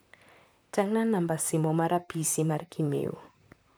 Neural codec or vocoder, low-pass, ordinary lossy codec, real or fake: vocoder, 44.1 kHz, 128 mel bands, Pupu-Vocoder; none; none; fake